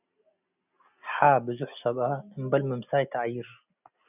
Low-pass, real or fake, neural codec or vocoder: 3.6 kHz; real; none